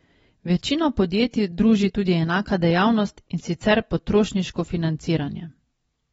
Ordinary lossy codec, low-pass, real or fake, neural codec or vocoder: AAC, 24 kbps; 19.8 kHz; real; none